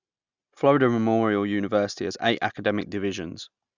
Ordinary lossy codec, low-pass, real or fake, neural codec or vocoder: Opus, 64 kbps; 7.2 kHz; real; none